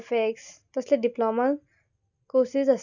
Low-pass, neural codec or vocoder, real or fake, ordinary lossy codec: 7.2 kHz; none; real; none